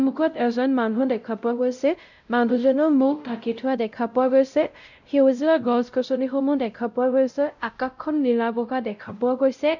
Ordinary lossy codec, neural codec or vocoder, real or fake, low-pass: none; codec, 16 kHz, 0.5 kbps, X-Codec, WavLM features, trained on Multilingual LibriSpeech; fake; 7.2 kHz